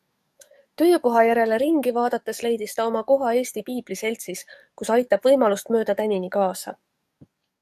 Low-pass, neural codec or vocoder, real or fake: 14.4 kHz; codec, 44.1 kHz, 7.8 kbps, DAC; fake